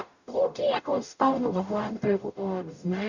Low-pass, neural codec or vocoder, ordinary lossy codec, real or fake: 7.2 kHz; codec, 44.1 kHz, 0.9 kbps, DAC; none; fake